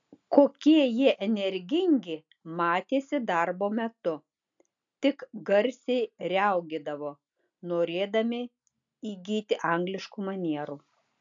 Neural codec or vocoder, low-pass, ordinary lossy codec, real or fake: none; 7.2 kHz; AAC, 64 kbps; real